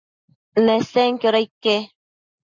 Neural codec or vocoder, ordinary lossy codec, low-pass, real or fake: none; Opus, 64 kbps; 7.2 kHz; real